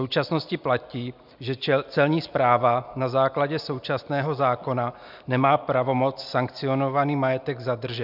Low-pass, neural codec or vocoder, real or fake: 5.4 kHz; none; real